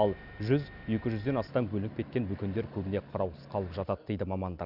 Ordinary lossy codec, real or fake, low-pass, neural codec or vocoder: none; real; 5.4 kHz; none